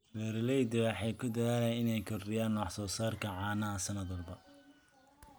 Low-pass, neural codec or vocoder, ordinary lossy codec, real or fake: none; none; none; real